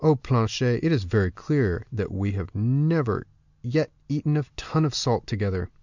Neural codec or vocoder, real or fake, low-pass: none; real; 7.2 kHz